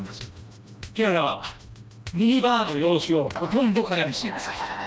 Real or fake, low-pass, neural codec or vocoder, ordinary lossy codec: fake; none; codec, 16 kHz, 1 kbps, FreqCodec, smaller model; none